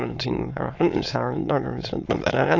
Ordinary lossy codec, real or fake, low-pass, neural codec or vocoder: AAC, 48 kbps; fake; 7.2 kHz; autoencoder, 22.05 kHz, a latent of 192 numbers a frame, VITS, trained on many speakers